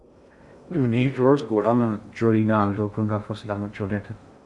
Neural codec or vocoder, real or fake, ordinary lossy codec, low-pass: codec, 16 kHz in and 24 kHz out, 0.6 kbps, FocalCodec, streaming, 2048 codes; fake; Opus, 64 kbps; 10.8 kHz